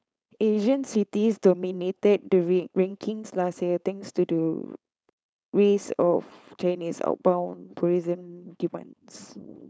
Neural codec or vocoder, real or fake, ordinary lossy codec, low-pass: codec, 16 kHz, 4.8 kbps, FACodec; fake; none; none